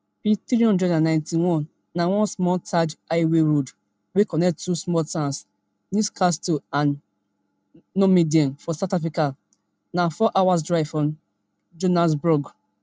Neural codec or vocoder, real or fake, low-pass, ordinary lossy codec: none; real; none; none